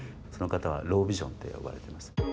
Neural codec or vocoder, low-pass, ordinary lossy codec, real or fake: none; none; none; real